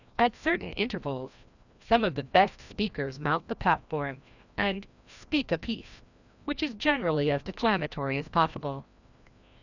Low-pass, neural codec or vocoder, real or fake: 7.2 kHz; codec, 16 kHz, 1 kbps, FreqCodec, larger model; fake